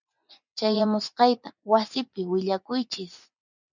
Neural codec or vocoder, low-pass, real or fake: vocoder, 24 kHz, 100 mel bands, Vocos; 7.2 kHz; fake